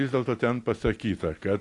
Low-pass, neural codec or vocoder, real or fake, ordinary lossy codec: 10.8 kHz; none; real; AAC, 48 kbps